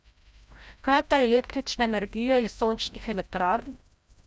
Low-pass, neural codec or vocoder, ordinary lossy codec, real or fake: none; codec, 16 kHz, 0.5 kbps, FreqCodec, larger model; none; fake